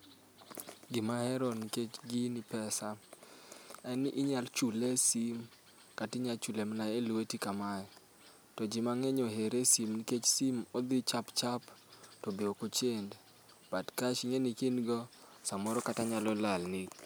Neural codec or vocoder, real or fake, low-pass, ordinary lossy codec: none; real; none; none